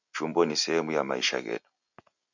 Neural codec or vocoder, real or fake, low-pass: none; real; 7.2 kHz